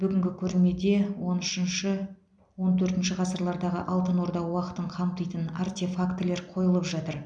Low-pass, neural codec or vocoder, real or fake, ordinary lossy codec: 9.9 kHz; none; real; none